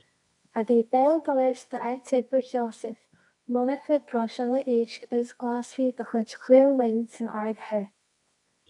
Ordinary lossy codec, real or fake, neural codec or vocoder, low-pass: MP3, 96 kbps; fake; codec, 24 kHz, 0.9 kbps, WavTokenizer, medium music audio release; 10.8 kHz